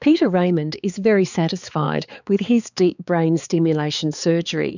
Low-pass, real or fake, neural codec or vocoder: 7.2 kHz; fake; codec, 16 kHz, 4 kbps, X-Codec, HuBERT features, trained on balanced general audio